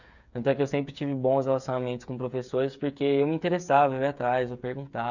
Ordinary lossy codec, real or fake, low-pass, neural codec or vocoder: none; fake; 7.2 kHz; codec, 16 kHz, 8 kbps, FreqCodec, smaller model